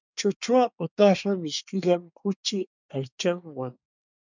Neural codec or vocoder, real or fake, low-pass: codec, 24 kHz, 1 kbps, SNAC; fake; 7.2 kHz